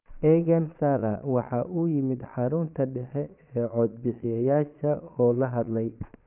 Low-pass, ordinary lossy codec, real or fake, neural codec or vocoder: 3.6 kHz; none; fake; vocoder, 22.05 kHz, 80 mel bands, Vocos